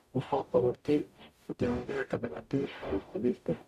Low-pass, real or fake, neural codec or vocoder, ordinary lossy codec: 14.4 kHz; fake; codec, 44.1 kHz, 0.9 kbps, DAC; AAC, 96 kbps